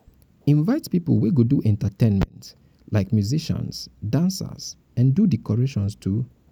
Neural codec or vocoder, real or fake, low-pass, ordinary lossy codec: none; real; 19.8 kHz; none